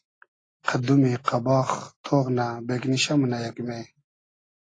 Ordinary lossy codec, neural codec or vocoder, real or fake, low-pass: AAC, 32 kbps; none; real; 9.9 kHz